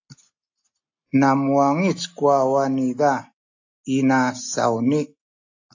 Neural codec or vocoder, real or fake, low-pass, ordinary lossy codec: none; real; 7.2 kHz; AAC, 48 kbps